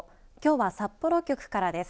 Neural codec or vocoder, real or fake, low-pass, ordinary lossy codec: none; real; none; none